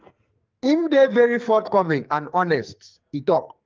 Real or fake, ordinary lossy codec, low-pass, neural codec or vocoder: fake; Opus, 32 kbps; 7.2 kHz; codec, 44.1 kHz, 2.6 kbps, SNAC